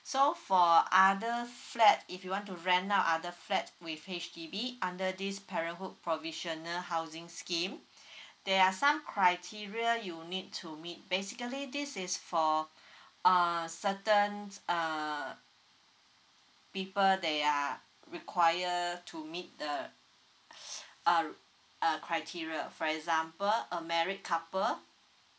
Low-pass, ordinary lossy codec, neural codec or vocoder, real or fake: none; none; none; real